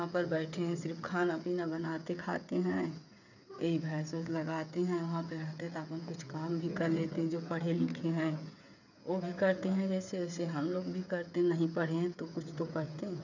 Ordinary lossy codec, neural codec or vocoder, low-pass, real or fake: none; codec, 16 kHz, 8 kbps, FreqCodec, smaller model; 7.2 kHz; fake